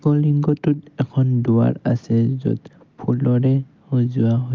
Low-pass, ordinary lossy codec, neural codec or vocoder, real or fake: 7.2 kHz; Opus, 32 kbps; none; real